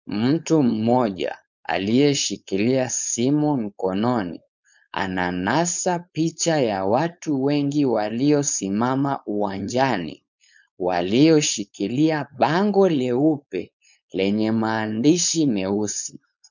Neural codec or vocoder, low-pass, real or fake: codec, 16 kHz, 4.8 kbps, FACodec; 7.2 kHz; fake